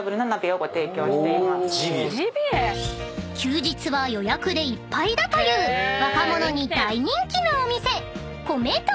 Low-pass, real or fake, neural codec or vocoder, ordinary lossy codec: none; real; none; none